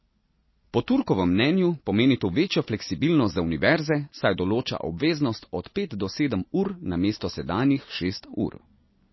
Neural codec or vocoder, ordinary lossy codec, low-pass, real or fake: none; MP3, 24 kbps; 7.2 kHz; real